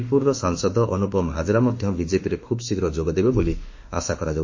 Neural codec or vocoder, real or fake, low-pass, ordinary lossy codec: autoencoder, 48 kHz, 32 numbers a frame, DAC-VAE, trained on Japanese speech; fake; 7.2 kHz; MP3, 32 kbps